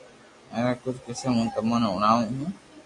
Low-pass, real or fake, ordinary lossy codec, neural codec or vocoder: 10.8 kHz; real; AAC, 64 kbps; none